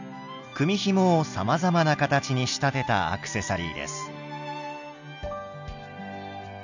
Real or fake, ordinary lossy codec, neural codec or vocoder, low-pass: real; none; none; 7.2 kHz